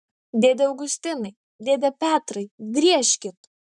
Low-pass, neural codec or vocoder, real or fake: 10.8 kHz; vocoder, 44.1 kHz, 128 mel bands, Pupu-Vocoder; fake